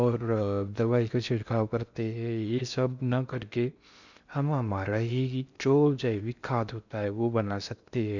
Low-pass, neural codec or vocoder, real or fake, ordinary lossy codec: 7.2 kHz; codec, 16 kHz in and 24 kHz out, 0.6 kbps, FocalCodec, streaming, 2048 codes; fake; none